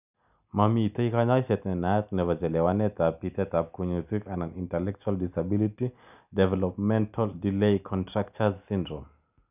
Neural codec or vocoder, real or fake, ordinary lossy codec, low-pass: none; real; none; 3.6 kHz